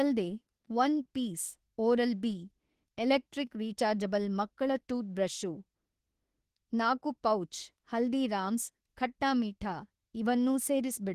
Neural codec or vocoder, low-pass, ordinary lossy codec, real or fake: autoencoder, 48 kHz, 32 numbers a frame, DAC-VAE, trained on Japanese speech; 14.4 kHz; Opus, 16 kbps; fake